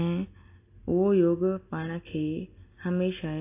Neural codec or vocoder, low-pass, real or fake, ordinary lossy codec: none; 3.6 kHz; real; AAC, 24 kbps